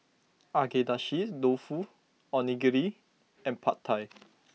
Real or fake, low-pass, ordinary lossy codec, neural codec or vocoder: real; none; none; none